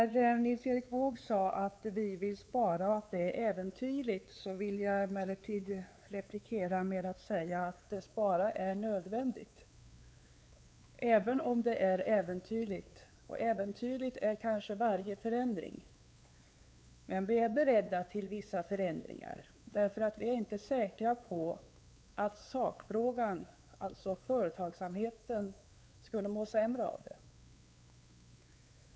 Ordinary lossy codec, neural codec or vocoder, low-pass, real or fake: none; codec, 16 kHz, 4 kbps, X-Codec, WavLM features, trained on Multilingual LibriSpeech; none; fake